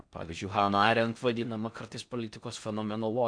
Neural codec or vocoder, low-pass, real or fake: codec, 16 kHz in and 24 kHz out, 0.6 kbps, FocalCodec, streaming, 4096 codes; 9.9 kHz; fake